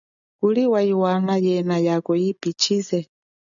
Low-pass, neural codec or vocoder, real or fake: 7.2 kHz; none; real